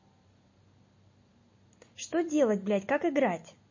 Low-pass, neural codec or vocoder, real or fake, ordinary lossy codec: 7.2 kHz; none; real; MP3, 32 kbps